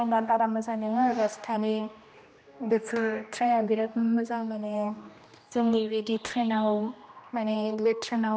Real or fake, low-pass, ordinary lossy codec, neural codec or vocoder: fake; none; none; codec, 16 kHz, 1 kbps, X-Codec, HuBERT features, trained on general audio